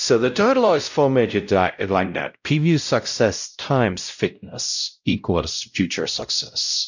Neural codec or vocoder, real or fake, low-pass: codec, 16 kHz, 0.5 kbps, X-Codec, WavLM features, trained on Multilingual LibriSpeech; fake; 7.2 kHz